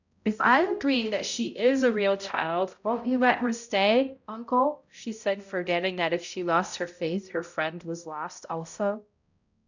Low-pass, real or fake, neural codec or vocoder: 7.2 kHz; fake; codec, 16 kHz, 0.5 kbps, X-Codec, HuBERT features, trained on balanced general audio